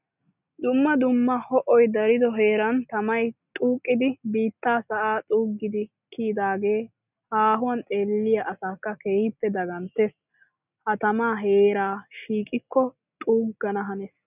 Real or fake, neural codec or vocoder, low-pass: real; none; 3.6 kHz